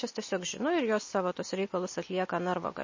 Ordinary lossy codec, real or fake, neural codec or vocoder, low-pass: MP3, 32 kbps; real; none; 7.2 kHz